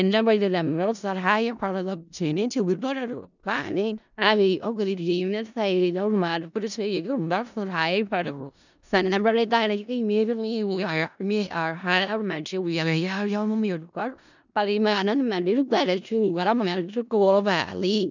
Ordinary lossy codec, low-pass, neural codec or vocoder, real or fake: none; 7.2 kHz; codec, 16 kHz in and 24 kHz out, 0.4 kbps, LongCat-Audio-Codec, four codebook decoder; fake